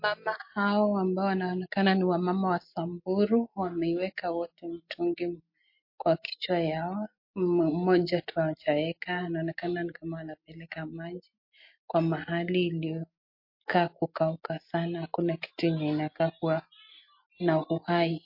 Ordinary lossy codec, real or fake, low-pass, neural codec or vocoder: MP3, 32 kbps; real; 5.4 kHz; none